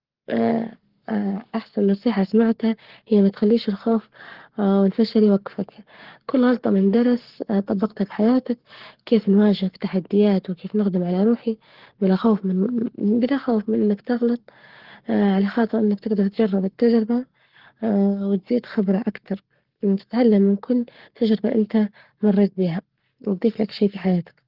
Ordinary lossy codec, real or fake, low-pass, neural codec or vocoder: Opus, 16 kbps; fake; 5.4 kHz; codec, 44.1 kHz, 7.8 kbps, Pupu-Codec